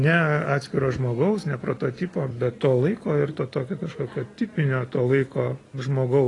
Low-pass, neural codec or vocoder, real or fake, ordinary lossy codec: 10.8 kHz; none; real; AAC, 32 kbps